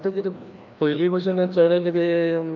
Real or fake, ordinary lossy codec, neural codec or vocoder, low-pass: fake; none; codec, 16 kHz, 1 kbps, FreqCodec, larger model; 7.2 kHz